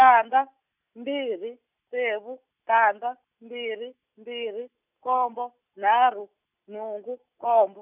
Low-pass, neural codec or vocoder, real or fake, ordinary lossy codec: 3.6 kHz; none; real; none